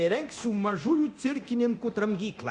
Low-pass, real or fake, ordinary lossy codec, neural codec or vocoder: 10.8 kHz; fake; Opus, 64 kbps; codec, 24 kHz, 0.9 kbps, DualCodec